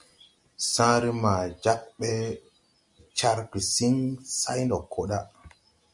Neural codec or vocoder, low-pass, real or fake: none; 10.8 kHz; real